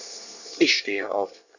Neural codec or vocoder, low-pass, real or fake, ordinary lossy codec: codec, 24 kHz, 1 kbps, SNAC; 7.2 kHz; fake; none